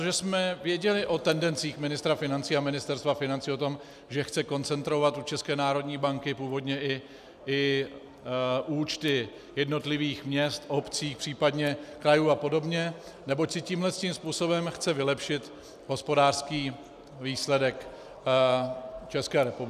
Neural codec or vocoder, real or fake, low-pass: none; real; 14.4 kHz